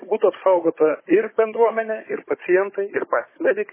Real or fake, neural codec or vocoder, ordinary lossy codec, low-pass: fake; codec, 16 kHz, 16 kbps, FunCodec, trained on Chinese and English, 50 frames a second; MP3, 16 kbps; 3.6 kHz